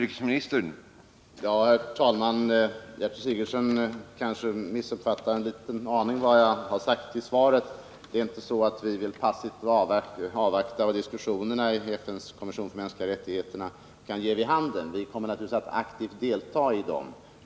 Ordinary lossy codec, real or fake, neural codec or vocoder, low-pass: none; real; none; none